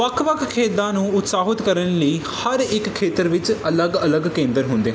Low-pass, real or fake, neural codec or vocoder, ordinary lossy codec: none; real; none; none